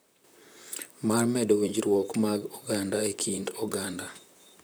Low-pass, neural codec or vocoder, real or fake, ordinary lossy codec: none; vocoder, 44.1 kHz, 128 mel bands, Pupu-Vocoder; fake; none